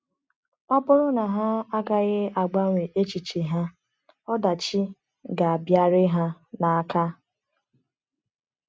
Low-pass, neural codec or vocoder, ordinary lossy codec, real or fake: none; none; none; real